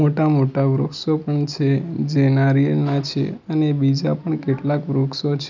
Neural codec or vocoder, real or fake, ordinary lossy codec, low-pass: none; real; none; 7.2 kHz